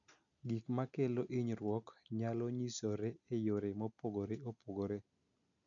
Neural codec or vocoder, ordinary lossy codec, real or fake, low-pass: none; none; real; 7.2 kHz